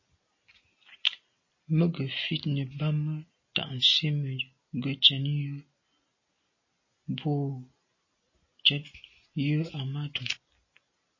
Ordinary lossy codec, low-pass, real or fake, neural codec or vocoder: MP3, 32 kbps; 7.2 kHz; real; none